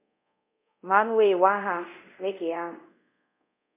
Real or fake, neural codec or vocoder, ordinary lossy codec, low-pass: fake; codec, 24 kHz, 0.5 kbps, DualCodec; MP3, 32 kbps; 3.6 kHz